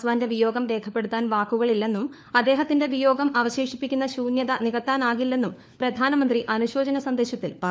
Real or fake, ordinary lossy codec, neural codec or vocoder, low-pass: fake; none; codec, 16 kHz, 4 kbps, FunCodec, trained on LibriTTS, 50 frames a second; none